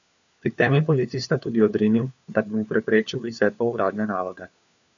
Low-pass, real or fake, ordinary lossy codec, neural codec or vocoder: 7.2 kHz; fake; none; codec, 16 kHz, 4 kbps, FunCodec, trained on LibriTTS, 50 frames a second